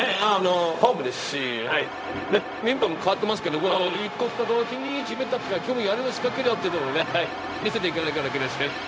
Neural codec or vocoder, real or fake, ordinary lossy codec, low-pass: codec, 16 kHz, 0.4 kbps, LongCat-Audio-Codec; fake; none; none